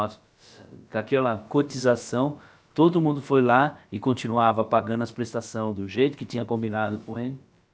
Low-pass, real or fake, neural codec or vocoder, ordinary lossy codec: none; fake; codec, 16 kHz, about 1 kbps, DyCAST, with the encoder's durations; none